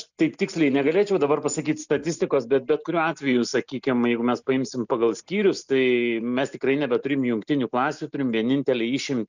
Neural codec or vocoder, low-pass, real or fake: none; 7.2 kHz; real